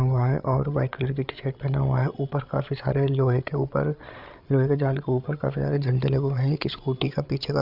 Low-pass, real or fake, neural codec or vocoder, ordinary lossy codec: 5.4 kHz; fake; codec, 16 kHz, 8 kbps, FreqCodec, larger model; none